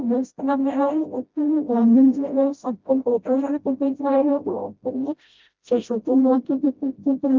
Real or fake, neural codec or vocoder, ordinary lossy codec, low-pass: fake; codec, 16 kHz, 0.5 kbps, FreqCodec, smaller model; Opus, 24 kbps; 7.2 kHz